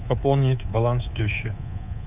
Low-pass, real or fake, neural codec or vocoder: 3.6 kHz; fake; codec, 24 kHz, 3.1 kbps, DualCodec